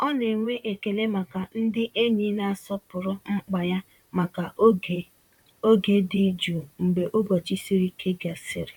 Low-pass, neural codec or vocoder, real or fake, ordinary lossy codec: 19.8 kHz; vocoder, 44.1 kHz, 128 mel bands, Pupu-Vocoder; fake; none